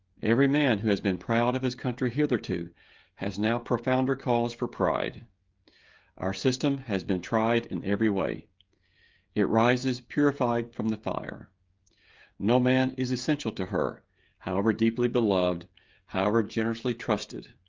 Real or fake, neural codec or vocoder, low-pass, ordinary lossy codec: fake; codec, 16 kHz, 16 kbps, FreqCodec, smaller model; 7.2 kHz; Opus, 32 kbps